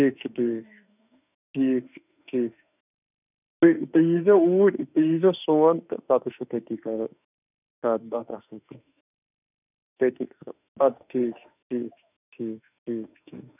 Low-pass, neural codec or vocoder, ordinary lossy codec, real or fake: 3.6 kHz; autoencoder, 48 kHz, 32 numbers a frame, DAC-VAE, trained on Japanese speech; none; fake